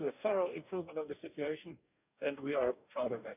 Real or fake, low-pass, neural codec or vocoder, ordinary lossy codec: fake; 3.6 kHz; codec, 44.1 kHz, 2.6 kbps, DAC; none